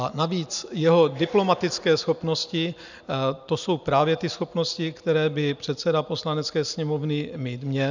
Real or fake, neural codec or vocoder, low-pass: real; none; 7.2 kHz